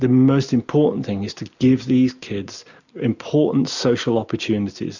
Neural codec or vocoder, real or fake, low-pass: none; real; 7.2 kHz